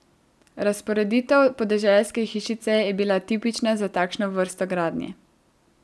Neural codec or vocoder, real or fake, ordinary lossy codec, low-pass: none; real; none; none